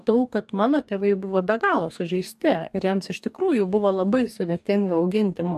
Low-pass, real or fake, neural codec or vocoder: 14.4 kHz; fake; codec, 44.1 kHz, 2.6 kbps, DAC